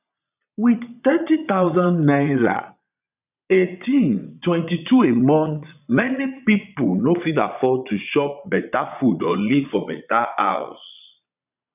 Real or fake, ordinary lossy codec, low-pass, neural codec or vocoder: fake; none; 3.6 kHz; vocoder, 22.05 kHz, 80 mel bands, Vocos